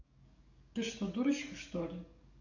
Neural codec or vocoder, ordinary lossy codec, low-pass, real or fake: codec, 44.1 kHz, 7.8 kbps, DAC; none; 7.2 kHz; fake